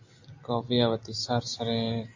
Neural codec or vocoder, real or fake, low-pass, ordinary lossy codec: none; real; 7.2 kHz; AAC, 48 kbps